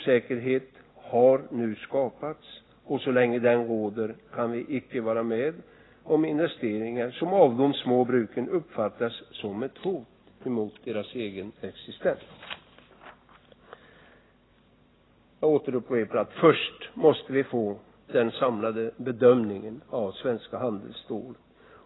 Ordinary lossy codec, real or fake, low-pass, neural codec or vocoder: AAC, 16 kbps; real; 7.2 kHz; none